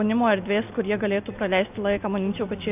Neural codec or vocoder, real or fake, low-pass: none; real; 3.6 kHz